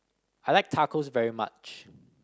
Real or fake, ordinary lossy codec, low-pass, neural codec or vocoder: real; none; none; none